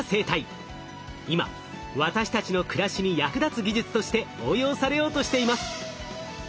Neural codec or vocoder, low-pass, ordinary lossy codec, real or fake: none; none; none; real